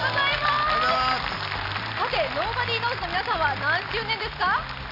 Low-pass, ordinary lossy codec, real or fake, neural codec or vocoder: 5.4 kHz; none; real; none